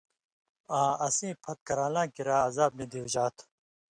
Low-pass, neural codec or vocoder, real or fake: 9.9 kHz; none; real